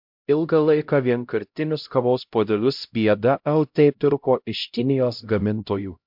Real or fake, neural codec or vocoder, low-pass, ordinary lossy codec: fake; codec, 16 kHz, 0.5 kbps, X-Codec, HuBERT features, trained on LibriSpeech; 5.4 kHz; MP3, 48 kbps